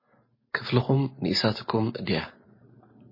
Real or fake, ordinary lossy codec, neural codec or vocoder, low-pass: real; MP3, 24 kbps; none; 5.4 kHz